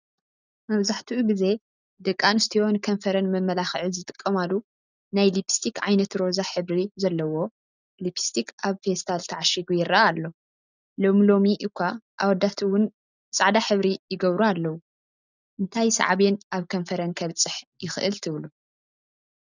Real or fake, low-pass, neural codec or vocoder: real; 7.2 kHz; none